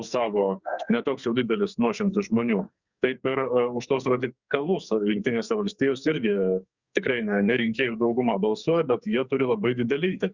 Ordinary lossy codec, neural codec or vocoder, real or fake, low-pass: Opus, 64 kbps; codec, 44.1 kHz, 2.6 kbps, SNAC; fake; 7.2 kHz